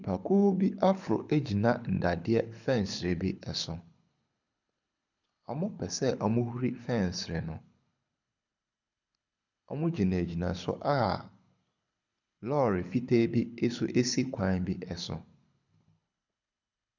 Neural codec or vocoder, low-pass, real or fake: codec, 24 kHz, 6 kbps, HILCodec; 7.2 kHz; fake